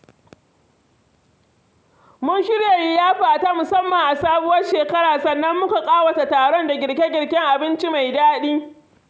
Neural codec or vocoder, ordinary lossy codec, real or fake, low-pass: none; none; real; none